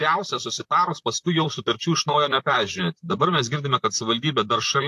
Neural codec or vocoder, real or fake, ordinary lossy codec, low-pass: vocoder, 44.1 kHz, 128 mel bands, Pupu-Vocoder; fake; AAC, 64 kbps; 14.4 kHz